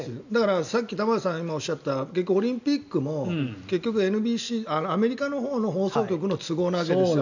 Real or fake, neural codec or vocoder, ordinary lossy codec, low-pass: real; none; none; 7.2 kHz